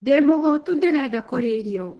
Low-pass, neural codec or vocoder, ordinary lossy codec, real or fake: 10.8 kHz; codec, 24 kHz, 1.5 kbps, HILCodec; Opus, 16 kbps; fake